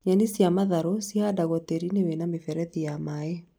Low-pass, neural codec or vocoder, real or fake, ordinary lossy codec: none; none; real; none